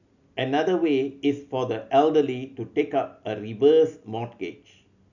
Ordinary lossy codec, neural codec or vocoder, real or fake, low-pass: none; none; real; 7.2 kHz